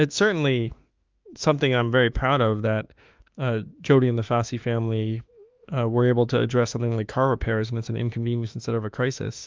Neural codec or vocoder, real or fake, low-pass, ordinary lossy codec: autoencoder, 48 kHz, 32 numbers a frame, DAC-VAE, trained on Japanese speech; fake; 7.2 kHz; Opus, 24 kbps